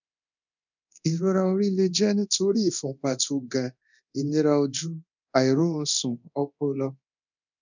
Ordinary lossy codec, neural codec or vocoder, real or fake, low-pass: none; codec, 24 kHz, 0.9 kbps, DualCodec; fake; 7.2 kHz